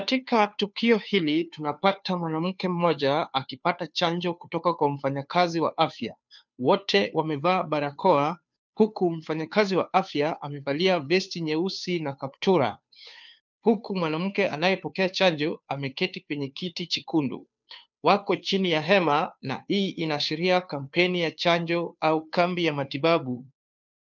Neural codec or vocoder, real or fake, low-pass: codec, 16 kHz, 2 kbps, FunCodec, trained on Chinese and English, 25 frames a second; fake; 7.2 kHz